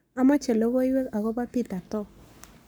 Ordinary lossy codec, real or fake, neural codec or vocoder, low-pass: none; fake; codec, 44.1 kHz, 7.8 kbps, Pupu-Codec; none